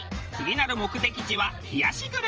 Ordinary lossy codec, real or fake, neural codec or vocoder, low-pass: Opus, 24 kbps; fake; vocoder, 44.1 kHz, 80 mel bands, Vocos; 7.2 kHz